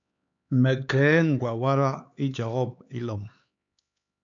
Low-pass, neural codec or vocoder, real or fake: 7.2 kHz; codec, 16 kHz, 4 kbps, X-Codec, HuBERT features, trained on LibriSpeech; fake